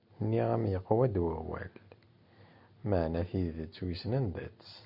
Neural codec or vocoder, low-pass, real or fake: none; 5.4 kHz; real